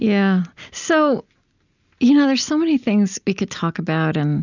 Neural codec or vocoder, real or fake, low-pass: none; real; 7.2 kHz